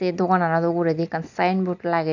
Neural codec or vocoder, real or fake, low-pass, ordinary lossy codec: none; real; 7.2 kHz; none